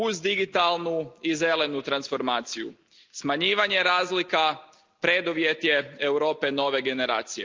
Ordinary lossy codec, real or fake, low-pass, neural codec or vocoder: Opus, 24 kbps; real; 7.2 kHz; none